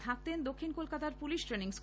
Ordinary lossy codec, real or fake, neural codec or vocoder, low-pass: none; real; none; none